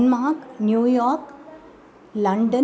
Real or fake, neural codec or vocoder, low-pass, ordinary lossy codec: real; none; none; none